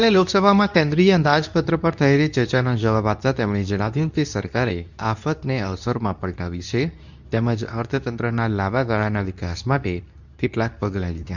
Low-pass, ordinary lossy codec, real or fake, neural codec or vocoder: 7.2 kHz; none; fake; codec, 24 kHz, 0.9 kbps, WavTokenizer, medium speech release version 2